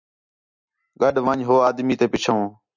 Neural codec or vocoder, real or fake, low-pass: none; real; 7.2 kHz